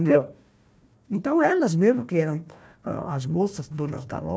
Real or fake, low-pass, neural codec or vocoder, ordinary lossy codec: fake; none; codec, 16 kHz, 1 kbps, FunCodec, trained on Chinese and English, 50 frames a second; none